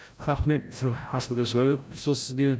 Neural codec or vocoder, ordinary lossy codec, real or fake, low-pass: codec, 16 kHz, 0.5 kbps, FreqCodec, larger model; none; fake; none